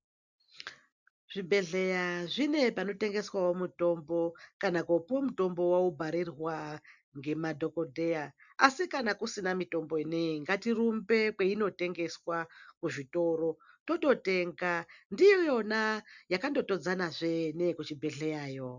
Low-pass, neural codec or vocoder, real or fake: 7.2 kHz; none; real